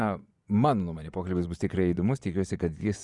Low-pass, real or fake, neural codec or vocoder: 10.8 kHz; fake; vocoder, 24 kHz, 100 mel bands, Vocos